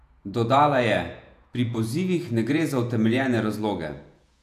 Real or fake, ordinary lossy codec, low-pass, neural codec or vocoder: fake; AAC, 96 kbps; 14.4 kHz; vocoder, 48 kHz, 128 mel bands, Vocos